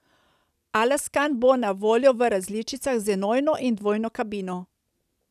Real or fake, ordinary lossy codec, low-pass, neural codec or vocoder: real; none; 14.4 kHz; none